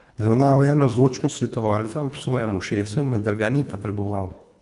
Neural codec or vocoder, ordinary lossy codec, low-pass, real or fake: codec, 24 kHz, 1.5 kbps, HILCodec; none; 10.8 kHz; fake